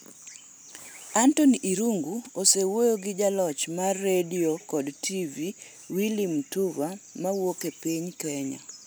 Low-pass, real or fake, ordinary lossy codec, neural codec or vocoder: none; real; none; none